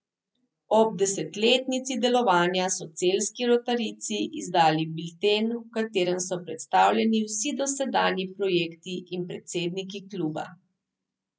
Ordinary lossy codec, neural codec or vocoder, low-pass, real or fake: none; none; none; real